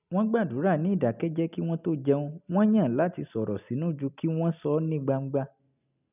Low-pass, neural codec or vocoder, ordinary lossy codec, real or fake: 3.6 kHz; none; none; real